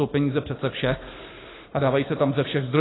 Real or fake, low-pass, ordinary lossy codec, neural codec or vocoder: real; 7.2 kHz; AAC, 16 kbps; none